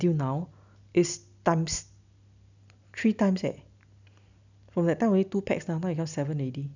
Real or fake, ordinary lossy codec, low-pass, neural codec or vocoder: real; none; 7.2 kHz; none